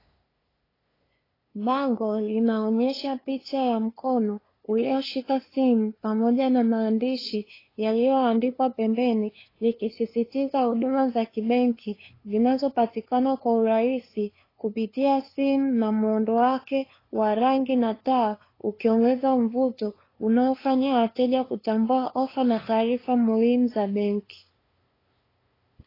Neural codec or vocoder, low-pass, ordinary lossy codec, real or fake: codec, 16 kHz, 2 kbps, FunCodec, trained on LibriTTS, 25 frames a second; 5.4 kHz; AAC, 24 kbps; fake